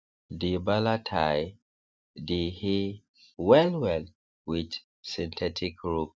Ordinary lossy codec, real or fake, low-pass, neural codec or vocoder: none; real; none; none